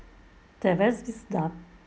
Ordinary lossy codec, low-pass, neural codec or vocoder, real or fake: none; none; none; real